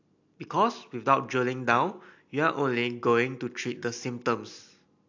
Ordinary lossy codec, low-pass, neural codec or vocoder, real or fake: AAC, 48 kbps; 7.2 kHz; none; real